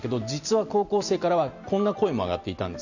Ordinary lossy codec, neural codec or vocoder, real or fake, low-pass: none; none; real; 7.2 kHz